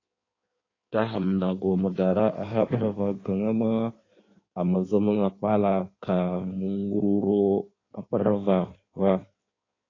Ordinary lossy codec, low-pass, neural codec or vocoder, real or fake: AAC, 32 kbps; 7.2 kHz; codec, 16 kHz in and 24 kHz out, 1.1 kbps, FireRedTTS-2 codec; fake